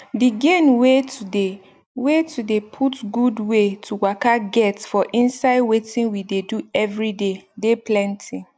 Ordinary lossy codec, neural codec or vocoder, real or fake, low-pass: none; none; real; none